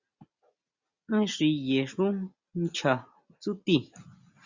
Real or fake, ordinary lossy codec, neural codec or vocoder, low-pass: real; Opus, 64 kbps; none; 7.2 kHz